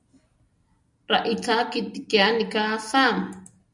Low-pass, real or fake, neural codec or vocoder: 10.8 kHz; real; none